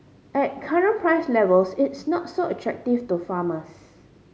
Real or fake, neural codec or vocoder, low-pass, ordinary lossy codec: real; none; none; none